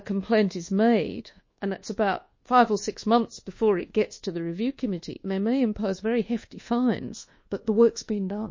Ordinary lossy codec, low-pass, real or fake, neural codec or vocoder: MP3, 32 kbps; 7.2 kHz; fake; codec, 24 kHz, 1.2 kbps, DualCodec